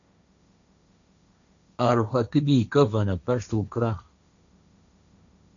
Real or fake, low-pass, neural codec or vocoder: fake; 7.2 kHz; codec, 16 kHz, 1.1 kbps, Voila-Tokenizer